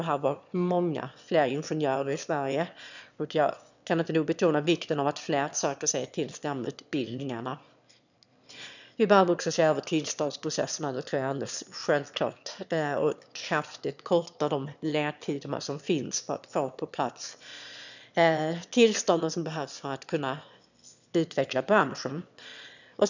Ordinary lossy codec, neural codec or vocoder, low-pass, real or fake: none; autoencoder, 22.05 kHz, a latent of 192 numbers a frame, VITS, trained on one speaker; 7.2 kHz; fake